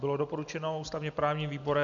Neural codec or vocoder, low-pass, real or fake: none; 7.2 kHz; real